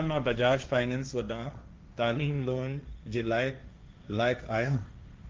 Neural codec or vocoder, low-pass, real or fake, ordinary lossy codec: codec, 16 kHz, 1.1 kbps, Voila-Tokenizer; 7.2 kHz; fake; Opus, 24 kbps